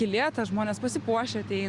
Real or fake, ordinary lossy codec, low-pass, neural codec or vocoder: real; Opus, 64 kbps; 10.8 kHz; none